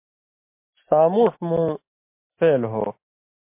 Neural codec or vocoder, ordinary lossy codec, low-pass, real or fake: none; MP3, 24 kbps; 3.6 kHz; real